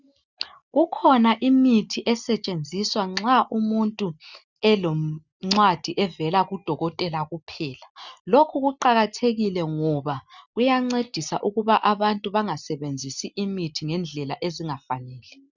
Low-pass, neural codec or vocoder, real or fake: 7.2 kHz; none; real